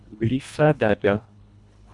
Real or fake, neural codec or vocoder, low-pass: fake; codec, 24 kHz, 1.5 kbps, HILCodec; 10.8 kHz